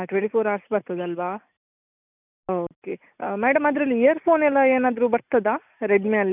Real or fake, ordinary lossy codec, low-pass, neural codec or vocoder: real; none; 3.6 kHz; none